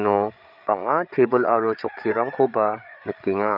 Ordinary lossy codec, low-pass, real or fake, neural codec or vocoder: none; 5.4 kHz; fake; codec, 16 kHz, 8 kbps, FreqCodec, larger model